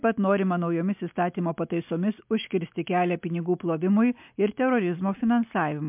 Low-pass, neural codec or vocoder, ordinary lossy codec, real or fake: 3.6 kHz; none; MP3, 32 kbps; real